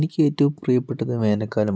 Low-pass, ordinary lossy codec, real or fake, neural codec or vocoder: none; none; real; none